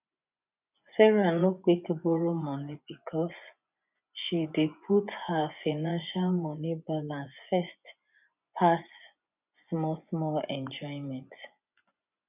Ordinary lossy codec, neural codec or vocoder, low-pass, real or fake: none; vocoder, 24 kHz, 100 mel bands, Vocos; 3.6 kHz; fake